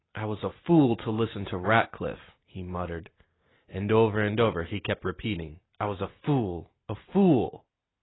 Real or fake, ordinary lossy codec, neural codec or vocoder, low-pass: real; AAC, 16 kbps; none; 7.2 kHz